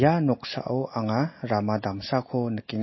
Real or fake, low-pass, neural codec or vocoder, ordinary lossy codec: real; 7.2 kHz; none; MP3, 24 kbps